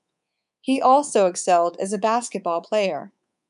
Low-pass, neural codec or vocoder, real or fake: 10.8 kHz; codec, 24 kHz, 3.1 kbps, DualCodec; fake